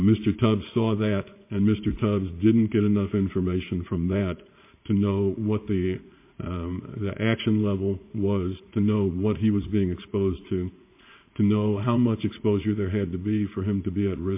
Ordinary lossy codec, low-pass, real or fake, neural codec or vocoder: MP3, 24 kbps; 3.6 kHz; fake; vocoder, 44.1 kHz, 80 mel bands, Vocos